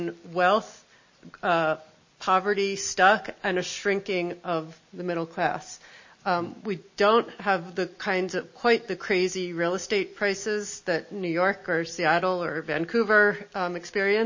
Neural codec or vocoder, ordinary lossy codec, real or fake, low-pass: none; MP3, 32 kbps; real; 7.2 kHz